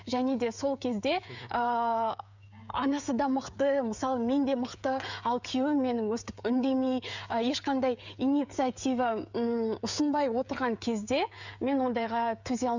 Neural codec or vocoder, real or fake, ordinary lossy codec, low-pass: codec, 16 kHz, 16 kbps, FreqCodec, smaller model; fake; none; 7.2 kHz